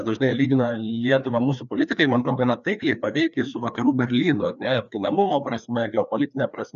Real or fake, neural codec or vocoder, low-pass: fake; codec, 16 kHz, 2 kbps, FreqCodec, larger model; 7.2 kHz